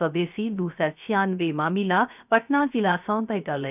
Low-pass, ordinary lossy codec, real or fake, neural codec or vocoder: 3.6 kHz; none; fake; codec, 16 kHz, 0.3 kbps, FocalCodec